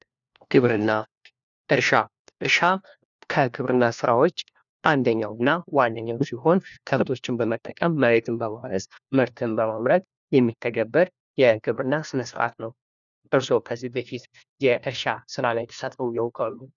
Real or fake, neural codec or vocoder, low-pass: fake; codec, 16 kHz, 1 kbps, FunCodec, trained on LibriTTS, 50 frames a second; 7.2 kHz